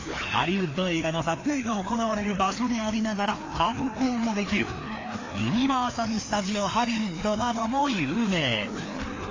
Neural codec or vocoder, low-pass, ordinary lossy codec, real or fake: codec, 16 kHz, 2 kbps, FreqCodec, larger model; 7.2 kHz; AAC, 32 kbps; fake